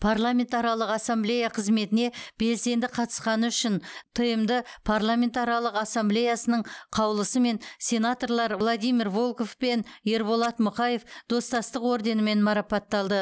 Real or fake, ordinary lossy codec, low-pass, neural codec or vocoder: real; none; none; none